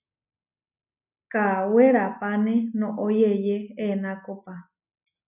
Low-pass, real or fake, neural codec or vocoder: 3.6 kHz; real; none